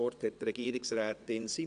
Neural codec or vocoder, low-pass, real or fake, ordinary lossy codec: vocoder, 22.05 kHz, 80 mel bands, WaveNeXt; 9.9 kHz; fake; none